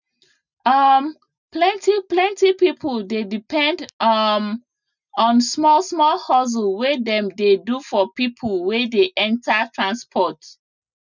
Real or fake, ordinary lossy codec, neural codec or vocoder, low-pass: real; none; none; 7.2 kHz